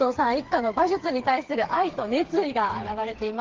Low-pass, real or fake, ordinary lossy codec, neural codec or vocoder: 7.2 kHz; fake; Opus, 16 kbps; codec, 16 kHz, 4 kbps, FreqCodec, smaller model